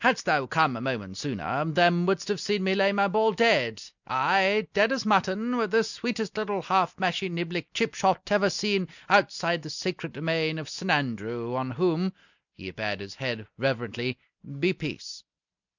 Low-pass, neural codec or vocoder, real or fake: 7.2 kHz; none; real